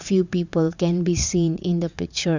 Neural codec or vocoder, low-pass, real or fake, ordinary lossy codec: vocoder, 44.1 kHz, 80 mel bands, Vocos; 7.2 kHz; fake; none